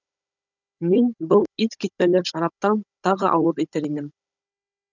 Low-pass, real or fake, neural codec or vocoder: 7.2 kHz; fake; codec, 16 kHz, 16 kbps, FunCodec, trained on Chinese and English, 50 frames a second